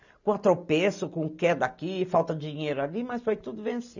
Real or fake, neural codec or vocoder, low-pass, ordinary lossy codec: real; none; 7.2 kHz; none